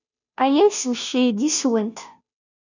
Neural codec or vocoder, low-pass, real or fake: codec, 16 kHz, 0.5 kbps, FunCodec, trained on Chinese and English, 25 frames a second; 7.2 kHz; fake